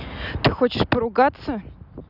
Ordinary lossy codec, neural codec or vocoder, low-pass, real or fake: none; none; 5.4 kHz; real